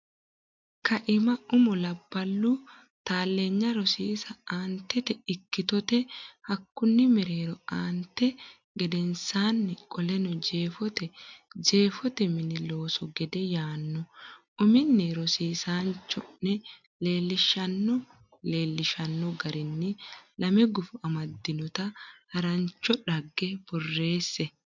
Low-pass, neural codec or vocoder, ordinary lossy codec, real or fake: 7.2 kHz; none; MP3, 64 kbps; real